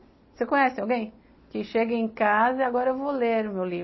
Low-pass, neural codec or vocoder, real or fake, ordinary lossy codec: 7.2 kHz; none; real; MP3, 24 kbps